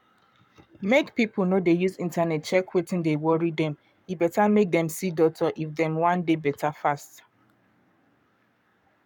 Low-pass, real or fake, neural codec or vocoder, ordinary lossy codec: 19.8 kHz; fake; codec, 44.1 kHz, 7.8 kbps, Pupu-Codec; none